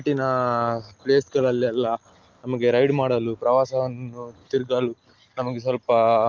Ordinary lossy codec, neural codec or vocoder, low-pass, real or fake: Opus, 32 kbps; none; 7.2 kHz; real